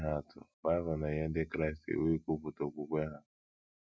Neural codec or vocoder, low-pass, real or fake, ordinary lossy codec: none; none; real; none